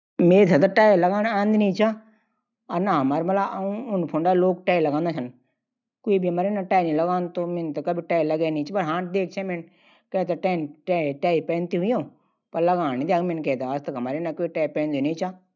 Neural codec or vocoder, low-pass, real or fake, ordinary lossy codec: none; 7.2 kHz; real; none